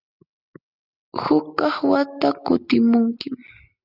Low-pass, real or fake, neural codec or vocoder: 5.4 kHz; real; none